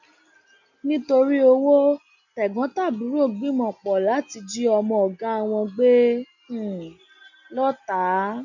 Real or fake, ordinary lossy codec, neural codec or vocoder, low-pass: real; none; none; 7.2 kHz